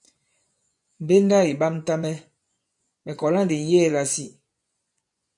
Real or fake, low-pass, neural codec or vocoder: fake; 10.8 kHz; vocoder, 24 kHz, 100 mel bands, Vocos